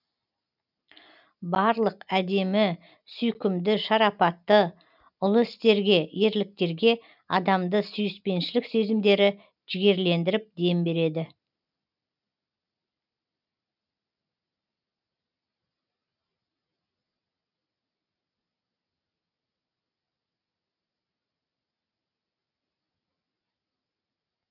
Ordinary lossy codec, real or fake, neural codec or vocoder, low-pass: none; real; none; 5.4 kHz